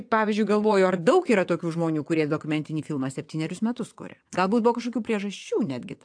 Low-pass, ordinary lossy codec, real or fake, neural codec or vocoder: 9.9 kHz; AAC, 64 kbps; fake; vocoder, 22.05 kHz, 80 mel bands, WaveNeXt